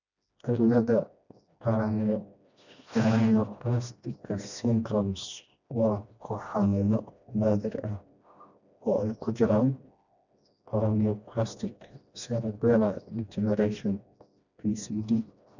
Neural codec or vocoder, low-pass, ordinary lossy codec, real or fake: codec, 16 kHz, 1 kbps, FreqCodec, smaller model; 7.2 kHz; none; fake